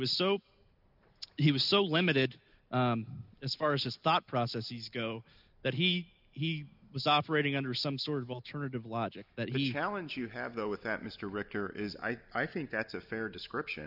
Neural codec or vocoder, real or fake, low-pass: none; real; 5.4 kHz